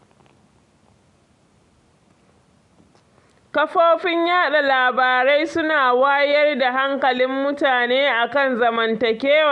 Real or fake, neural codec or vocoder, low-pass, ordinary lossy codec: real; none; 10.8 kHz; none